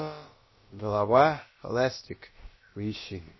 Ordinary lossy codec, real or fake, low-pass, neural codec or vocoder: MP3, 24 kbps; fake; 7.2 kHz; codec, 16 kHz, about 1 kbps, DyCAST, with the encoder's durations